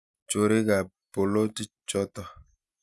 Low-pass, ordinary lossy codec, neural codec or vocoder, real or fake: none; none; none; real